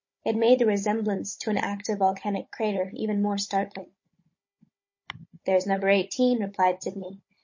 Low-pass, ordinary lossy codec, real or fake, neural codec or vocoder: 7.2 kHz; MP3, 32 kbps; fake; codec, 16 kHz, 16 kbps, FunCodec, trained on Chinese and English, 50 frames a second